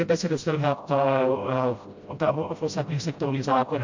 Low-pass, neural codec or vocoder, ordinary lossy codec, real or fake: 7.2 kHz; codec, 16 kHz, 0.5 kbps, FreqCodec, smaller model; MP3, 48 kbps; fake